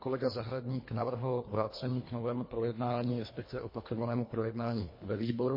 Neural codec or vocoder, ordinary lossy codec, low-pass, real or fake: codec, 24 kHz, 1.5 kbps, HILCodec; MP3, 24 kbps; 5.4 kHz; fake